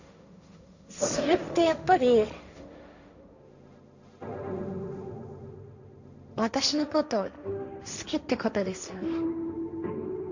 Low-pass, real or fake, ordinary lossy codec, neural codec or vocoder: 7.2 kHz; fake; none; codec, 16 kHz, 1.1 kbps, Voila-Tokenizer